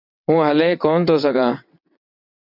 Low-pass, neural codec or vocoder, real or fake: 5.4 kHz; vocoder, 22.05 kHz, 80 mel bands, WaveNeXt; fake